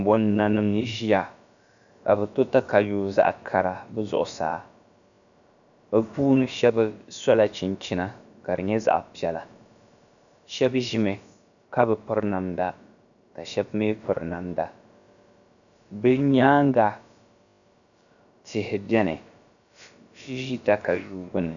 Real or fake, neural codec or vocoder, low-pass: fake; codec, 16 kHz, about 1 kbps, DyCAST, with the encoder's durations; 7.2 kHz